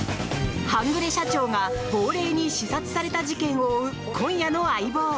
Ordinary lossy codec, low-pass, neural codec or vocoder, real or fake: none; none; none; real